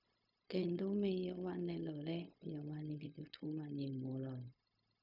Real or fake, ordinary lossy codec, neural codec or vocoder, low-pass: fake; none; codec, 16 kHz, 0.4 kbps, LongCat-Audio-Codec; 5.4 kHz